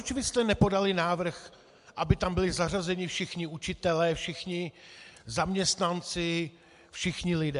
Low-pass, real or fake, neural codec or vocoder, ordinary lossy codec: 10.8 kHz; real; none; MP3, 64 kbps